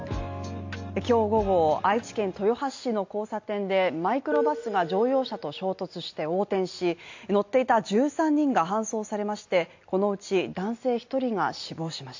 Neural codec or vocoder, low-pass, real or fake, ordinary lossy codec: none; 7.2 kHz; real; AAC, 48 kbps